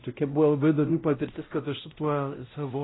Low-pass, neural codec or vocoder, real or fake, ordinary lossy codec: 7.2 kHz; codec, 16 kHz, 0.5 kbps, X-Codec, WavLM features, trained on Multilingual LibriSpeech; fake; AAC, 16 kbps